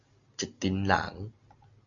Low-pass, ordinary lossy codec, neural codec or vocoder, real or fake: 7.2 kHz; AAC, 64 kbps; none; real